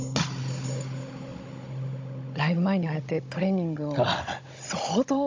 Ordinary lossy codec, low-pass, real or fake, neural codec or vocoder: AAC, 48 kbps; 7.2 kHz; fake; codec, 16 kHz, 16 kbps, FunCodec, trained on Chinese and English, 50 frames a second